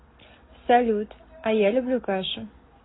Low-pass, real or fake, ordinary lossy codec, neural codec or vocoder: 7.2 kHz; real; AAC, 16 kbps; none